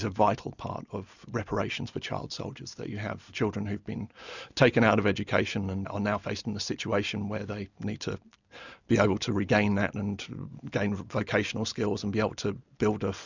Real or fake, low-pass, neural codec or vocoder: real; 7.2 kHz; none